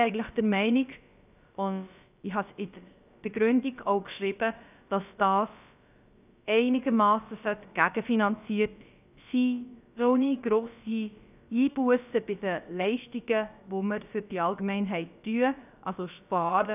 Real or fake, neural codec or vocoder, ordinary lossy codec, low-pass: fake; codec, 16 kHz, about 1 kbps, DyCAST, with the encoder's durations; none; 3.6 kHz